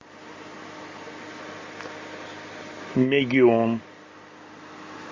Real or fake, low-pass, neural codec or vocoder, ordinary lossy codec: real; 7.2 kHz; none; MP3, 32 kbps